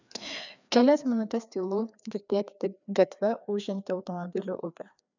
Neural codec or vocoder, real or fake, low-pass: codec, 16 kHz, 2 kbps, FreqCodec, larger model; fake; 7.2 kHz